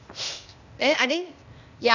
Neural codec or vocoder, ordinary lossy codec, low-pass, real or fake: codec, 16 kHz, 0.8 kbps, ZipCodec; none; 7.2 kHz; fake